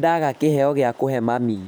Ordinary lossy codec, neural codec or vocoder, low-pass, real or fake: none; vocoder, 44.1 kHz, 128 mel bands every 512 samples, BigVGAN v2; none; fake